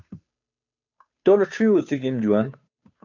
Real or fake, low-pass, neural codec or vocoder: fake; 7.2 kHz; codec, 16 kHz, 2 kbps, FunCodec, trained on Chinese and English, 25 frames a second